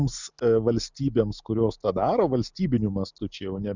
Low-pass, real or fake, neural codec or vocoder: 7.2 kHz; real; none